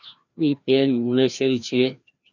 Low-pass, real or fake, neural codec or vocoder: 7.2 kHz; fake; codec, 16 kHz, 1 kbps, FreqCodec, larger model